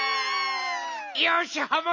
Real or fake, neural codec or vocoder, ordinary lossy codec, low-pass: real; none; none; 7.2 kHz